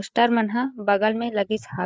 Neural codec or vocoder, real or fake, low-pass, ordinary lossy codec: codec, 16 kHz, 8 kbps, FreqCodec, larger model; fake; none; none